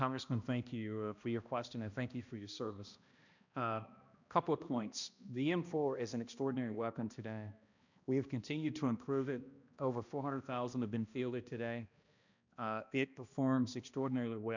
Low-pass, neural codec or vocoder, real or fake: 7.2 kHz; codec, 16 kHz, 1 kbps, X-Codec, HuBERT features, trained on balanced general audio; fake